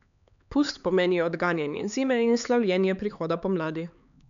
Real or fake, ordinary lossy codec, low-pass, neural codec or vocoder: fake; none; 7.2 kHz; codec, 16 kHz, 4 kbps, X-Codec, HuBERT features, trained on LibriSpeech